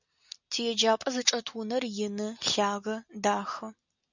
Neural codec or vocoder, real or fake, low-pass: none; real; 7.2 kHz